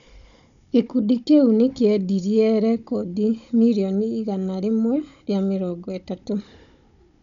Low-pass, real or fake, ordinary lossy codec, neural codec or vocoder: 7.2 kHz; fake; none; codec, 16 kHz, 16 kbps, FunCodec, trained on Chinese and English, 50 frames a second